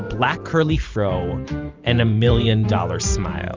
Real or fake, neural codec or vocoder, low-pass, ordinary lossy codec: fake; vocoder, 44.1 kHz, 128 mel bands every 512 samples, BigVGAN v2; 7.2 kHz; Opus, 32 kbps